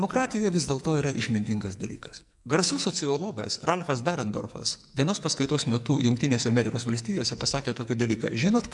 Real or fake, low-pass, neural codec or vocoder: fake; 10.8 kHz; codec, 44.1 kHz, 2.6 kbps, SNAC